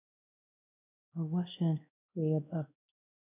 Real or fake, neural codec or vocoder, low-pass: fake; codec, 16 kHz, 1 kbps, X-Codec, WavLM features, trained on Multilingual LibriSpeech; 3.6 kHz